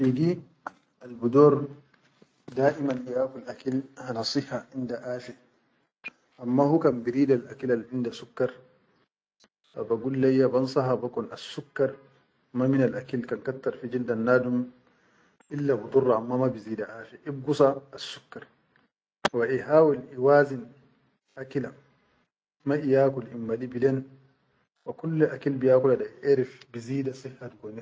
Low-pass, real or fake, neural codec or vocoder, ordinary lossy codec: none; real; none; none